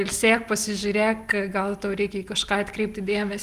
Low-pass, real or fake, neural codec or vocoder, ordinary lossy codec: 14.4 kHz; real; none; Opus, 32 kbps